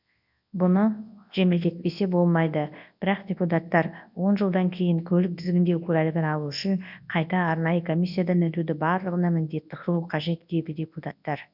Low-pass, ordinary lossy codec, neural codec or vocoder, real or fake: 5.4 kHz; none; codec, 24 kHz, 0.9 kbps, WavTokenizer, large speech release; fake